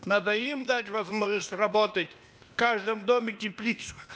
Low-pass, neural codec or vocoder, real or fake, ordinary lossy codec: none; codec, 16 kHz, 0.8 kbps, ZipCodec; fake; none